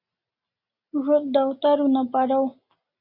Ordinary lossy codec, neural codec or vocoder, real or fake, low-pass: Opus, 64 kbps; none; real; 5.4 kHz